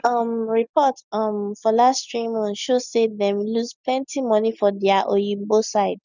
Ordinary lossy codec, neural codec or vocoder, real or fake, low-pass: none; none; real; 7.2 kHz